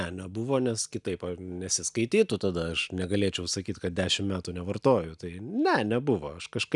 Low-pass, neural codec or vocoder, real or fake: 10.8 kHz; none; real